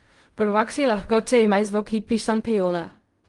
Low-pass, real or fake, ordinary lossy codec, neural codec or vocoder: 10.8 kHz; fake; Opus, 32 kbps; codec, 16 kHz in and 24 kHz out, 0.4 kbps, LongCat-Audio-Codec, fine tuned four codebook decoder